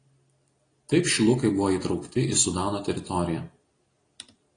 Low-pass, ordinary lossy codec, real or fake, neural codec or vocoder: 9.9 kHz; AAC, 32 kbps; real; none